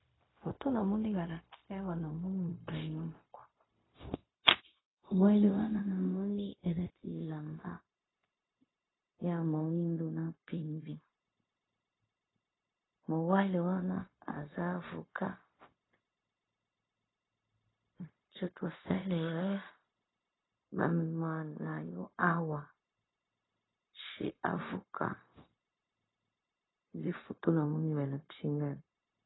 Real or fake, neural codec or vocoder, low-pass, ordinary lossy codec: fake; codec, 16 kHz, 0.4 kbps, LongCat-Audio-Codec; 7.2 kHz; AAC, 16 kbps